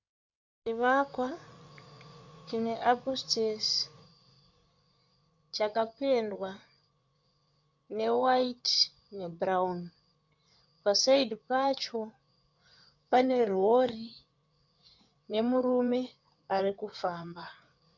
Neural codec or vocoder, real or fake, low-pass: codec, 16 kHz in and 24 kHz out, 2.2 kbps, FireRedTTS-2 codec; fake; 7.2 kHz